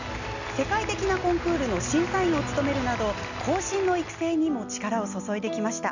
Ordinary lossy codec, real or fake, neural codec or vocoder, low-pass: none; real; none; 7.2 kHz